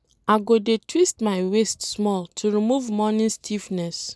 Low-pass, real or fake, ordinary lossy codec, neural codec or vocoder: none; real; none; none